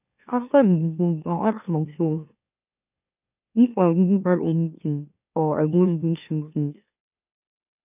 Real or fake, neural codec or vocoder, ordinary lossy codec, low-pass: fake; autoencoder, 44.1 kHz, a latent of 192 numbers a frame, MeloTTS; none; 3.6 kHz